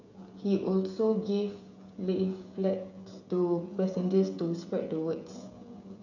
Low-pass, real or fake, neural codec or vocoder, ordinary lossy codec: 7.2 kHz; fake; codec, 16 kHz, 16 kbps, FreqCodec, smaller model; none